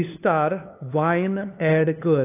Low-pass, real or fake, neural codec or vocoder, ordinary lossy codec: 3.6 kHz; fake; codec, 16 kHz, 2 kbps, FunCodec, trained on LibriTTS, 25 frames a second; AAC, 24 kbps